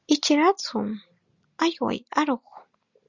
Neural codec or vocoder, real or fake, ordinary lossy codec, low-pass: none; real; Opus, 64 kbps; 7.2 kHz